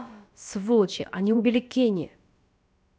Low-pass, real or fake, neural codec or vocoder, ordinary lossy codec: none; fake; codec, 16 kHz, about 1 kbps, DyCAST, with the encoder's durations; none